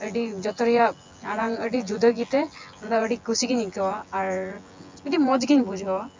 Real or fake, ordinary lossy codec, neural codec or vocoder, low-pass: fake; MP3, 64 kbps; vocoder, 24 kHz, 100 mel bands, Vocos; 7.2 kHz